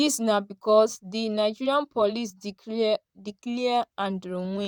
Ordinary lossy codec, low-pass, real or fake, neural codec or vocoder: none; 19.8 kHz; fake; vocoder, 44.1 kHz, 128 mel bands, Pupu-Vocoder